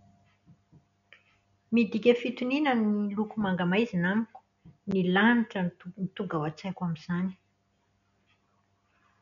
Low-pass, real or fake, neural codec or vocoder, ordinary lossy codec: 7.2 kHz; real; none; MP3, 96 kbps